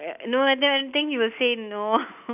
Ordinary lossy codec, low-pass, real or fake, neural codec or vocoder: none; 3.6 kHz; real; none